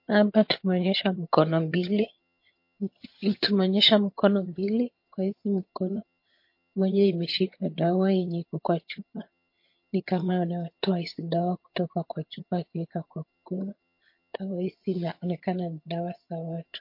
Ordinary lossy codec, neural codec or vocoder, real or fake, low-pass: MP3, 32 kbps; vocoder, 22.05 kHz, 80 mel bands, HiFi-GAN; fake; 5.4 kHz